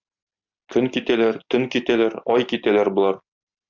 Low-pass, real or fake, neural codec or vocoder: 7.2 kHz; real; none